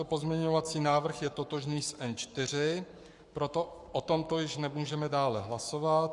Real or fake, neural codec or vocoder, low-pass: fake; codec, 44.1 kHz, 7.8 kbps, Pupu-Codec; 10.8 kHz